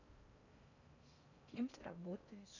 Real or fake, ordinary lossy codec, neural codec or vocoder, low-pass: fake; none; codec, 16 kHz in and 24 kHz out, 0.6 kbps, FocalCodec, streaming, 4096 codes; 7.2 kHz